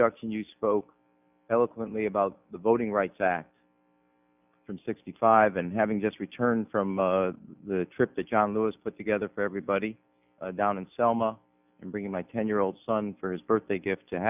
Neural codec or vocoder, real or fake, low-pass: none; real; 3.6 kHz